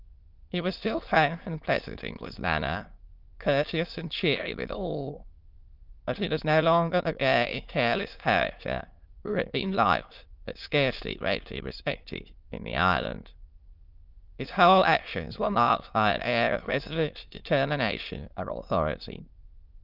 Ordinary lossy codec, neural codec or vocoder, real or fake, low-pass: Opus, 24 kbps; autoencoder, 22.05 kHz, a latent of 192 numbers a frame, VITS, trained on many speakers; fake; 5.4 kHz